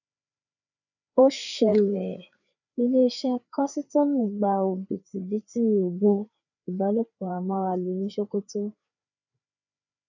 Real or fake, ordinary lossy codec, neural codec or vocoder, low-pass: fake; none; codec, 16 kHz, 4 kbps, FreqCodec, larger model; 7.2 kHz